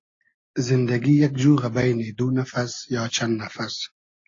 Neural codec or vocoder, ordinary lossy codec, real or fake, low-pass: none; AAC, 32 kbps; real; 7.2 kHz